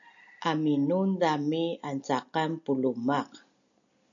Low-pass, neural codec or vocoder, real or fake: 7.2 kHz; none; real